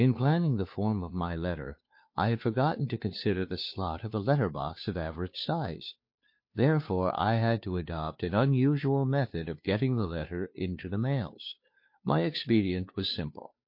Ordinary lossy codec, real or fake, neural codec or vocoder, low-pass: MP3, 32 kbps; fake; codec, 16 kHz, 2 kbps, FunCodec, trained on Chinese and English, 25 frames a second; 5.4 kHz